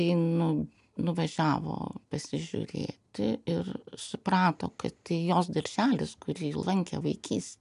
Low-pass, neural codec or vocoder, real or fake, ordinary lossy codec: 10.8 kHz; none; real; AAC, 96 kbps